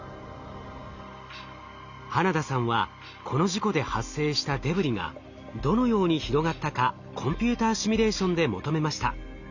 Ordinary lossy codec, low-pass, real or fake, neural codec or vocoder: Opus, 64 kbps; 7.2 kHz; real; none